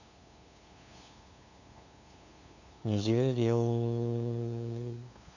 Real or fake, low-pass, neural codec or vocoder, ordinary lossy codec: fake; 7.2 kHz; codec, 16 kHz, 2 kbps, FunCodec, trained on LibriTTS, 25 frames a second; none